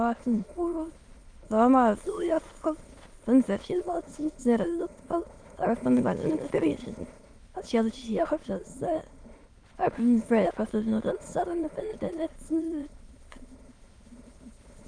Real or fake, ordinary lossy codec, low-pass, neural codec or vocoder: fake; Opus, 32 kbps; 9.9 kHz; autoencoder, 22.05 kHz, a latent of 192 numbers a frame, VITS, trained on many speakers